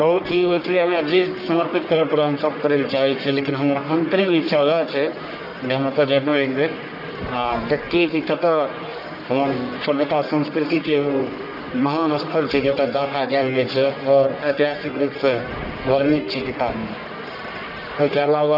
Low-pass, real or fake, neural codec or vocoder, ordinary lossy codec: 5.4 kHz; fake; codec, 44.1 kHz, 1.7 kbps, Pupu-Codec; none